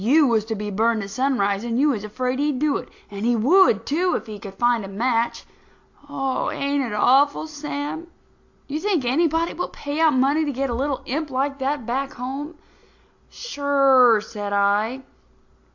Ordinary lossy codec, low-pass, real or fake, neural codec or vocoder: AAC, 48 kbps; 7.2 kHz; real; none